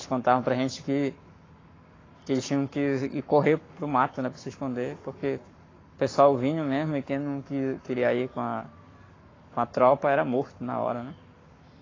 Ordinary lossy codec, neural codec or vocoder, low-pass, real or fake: AAC, 32 kbps; codec, 44.1 kHz, 7.8 kbps, Pupu-Codec; 7.2 kHz; fake